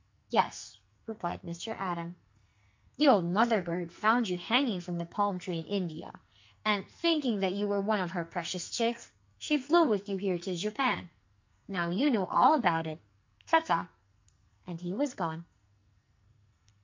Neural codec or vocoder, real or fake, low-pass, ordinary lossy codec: codec, 44.1 kHz, 2.6 kbps, SNAC; fake; 7.2 kHz; MP3, 48 kbps